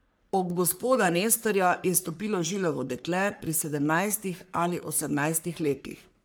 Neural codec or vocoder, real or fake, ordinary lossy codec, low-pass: codec, 44.1 kHz, 3.4 kbps, Pupu-Codec; fake; none; none